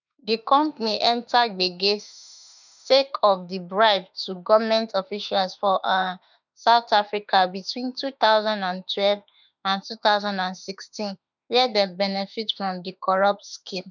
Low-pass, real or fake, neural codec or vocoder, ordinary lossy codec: 7.2 kHz; fake; autoencoder, 48 kHz, 32 numbers a frame, DAC-VAE, trained on Japanese speech; none